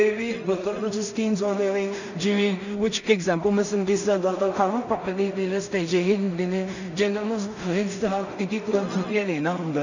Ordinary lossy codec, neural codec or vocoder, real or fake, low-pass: none; codec, 16 kHz in and 24 kHz out, 0.4 kbps, LongCat-Audio-Codec, two codebook decoder; fake; 7.2 kHz